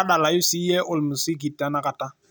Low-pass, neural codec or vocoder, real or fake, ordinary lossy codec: none; none; real; none